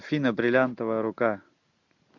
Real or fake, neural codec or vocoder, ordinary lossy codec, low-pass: real; none; MP3, 64 kbps; 7.2 kHz